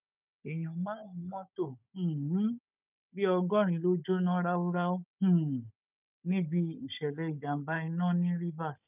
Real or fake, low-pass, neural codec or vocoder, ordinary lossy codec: fake; 3.6 kHz; codec, 16 kHz, 16 kbps, FunCodec, trained on Chinese and English, 50 frames a second; none